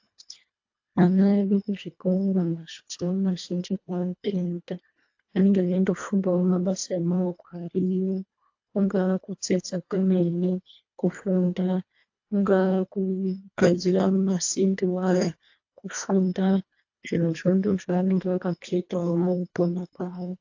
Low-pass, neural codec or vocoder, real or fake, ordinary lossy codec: 7.2 kHz; codec, 24 kHz, 1.5 kbps, HILCodec; fake; AAC, 48 kbps